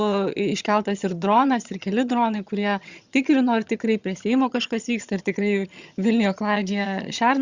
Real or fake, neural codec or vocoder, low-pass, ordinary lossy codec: fake; vocoder, 22.05 kHz, 80 mel bands, HiFi-GAN; 7.2 kHz; Opus, 64 kbps